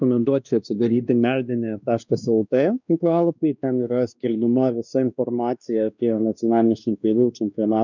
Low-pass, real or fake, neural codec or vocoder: 7.2 kHz; fake; codec, 16 kHz, 2 kbps, X-Codec, WavLM features, trained on Multilingual LibriSpeech